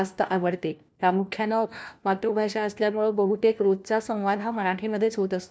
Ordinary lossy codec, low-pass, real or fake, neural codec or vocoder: none; none; fake; codec, 16 kHz, 1 kbps, FunCodec, trained on LibriTTS, 50 frames a second